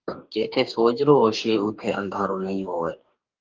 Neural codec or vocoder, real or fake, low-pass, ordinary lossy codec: codec, 44.1 kHz, 2.6 kbps, DAC; fake; 7.2 kHz; Opus, 24 kbps